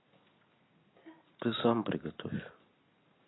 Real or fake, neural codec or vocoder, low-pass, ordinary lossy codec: real; none; 7.2 kHz; AAC, 16 kbps